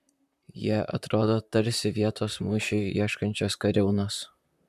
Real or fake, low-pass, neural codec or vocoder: fake; 14.4 kHz; vocoder, 44.1 kHz, 128 mel bands, Pupu-Vocoder